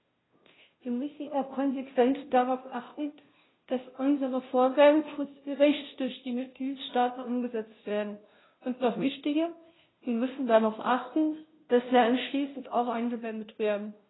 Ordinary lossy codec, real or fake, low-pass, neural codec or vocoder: AAC, 16 kbps; fake; 7.2 kHz; codec, 16 kHz, 0.5 kbps, FunCodec, trained on Chinese and English, 25 frames a second